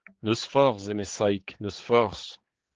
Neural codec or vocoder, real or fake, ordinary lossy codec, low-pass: codec, 16 kHz, 4 kbps, X-Codec, HuBERT features, trained on general audio; fake; Opus, 16 kbps; 7.2 kHz